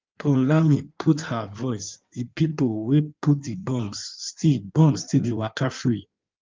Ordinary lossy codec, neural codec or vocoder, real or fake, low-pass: Opus, 24 kbps; codec, 16 kHz in and 24 kHz out, 1.1 kbps, FireRedTTS-2 codec; fake; 7.2 kHz